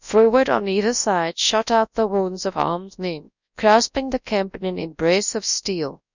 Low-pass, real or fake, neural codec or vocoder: 7.2 kHz; fake; codec, 24 kHz, 0.9 kbps, WavTokenizer, large speech release